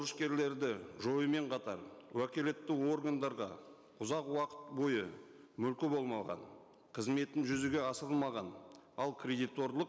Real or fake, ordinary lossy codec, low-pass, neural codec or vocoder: real; none; none; none